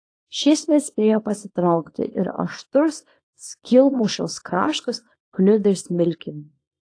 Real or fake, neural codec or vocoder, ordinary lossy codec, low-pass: fake; codec, 24 kHz, 0.9 kbps, WavTokenizer, small release; AAC, 48 kbps; 9.9 kHz